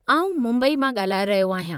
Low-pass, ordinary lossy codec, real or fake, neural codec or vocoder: 19.8 kHz; MP3, 96 kbps; fake; vocoder, 44.1 kHz, 128 mel bands, Pupu-Vocoder